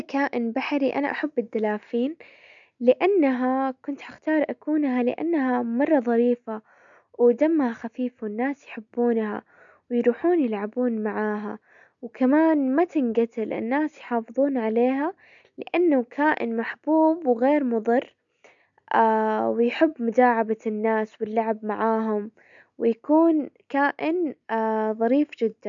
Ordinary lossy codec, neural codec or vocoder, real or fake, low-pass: none; none; real; 7.2 kHz